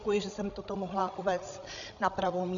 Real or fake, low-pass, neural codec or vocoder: fake; 7.2 kHz; codec, 16 kHz, 16 kbps, FreqCodec, larger model